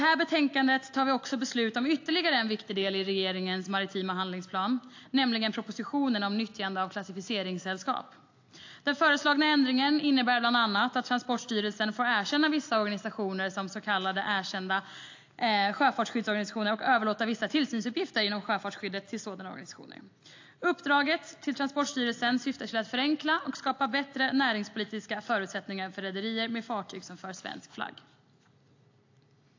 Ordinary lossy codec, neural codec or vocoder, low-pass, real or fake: AAC, 48 kbps; none; 7.2 kHz; real